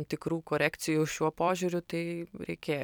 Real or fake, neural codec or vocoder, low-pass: fake; vocoder, 44.1 kHz, 128 mel bands every 256 samples, BigVGAN v2; 19.8 kHz